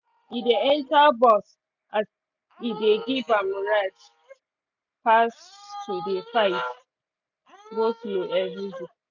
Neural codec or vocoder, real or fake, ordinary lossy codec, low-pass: none; real; none; 7.2 kHz